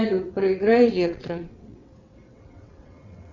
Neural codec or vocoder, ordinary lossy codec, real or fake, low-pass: vocoder, 22.05 kHz, 80 mel bands, WaveNeXt; Opus, 64 kbps; fake; 7.2 kHz